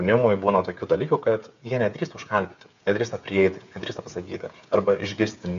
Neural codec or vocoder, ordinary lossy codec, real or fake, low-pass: codec, 16 kHz, 8 kbps, FreqCodec, smaller model; AAC, 64 kbps; fake; 7.2 kHz